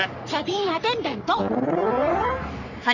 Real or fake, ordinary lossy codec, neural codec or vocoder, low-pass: fake; none; codec, 44.1 kHz, 3.4 kbps, Pupu-Codec; 7.2 kHz